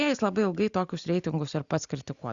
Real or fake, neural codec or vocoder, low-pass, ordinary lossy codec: real; none; 7.2 kHz; Opus, 64 kbps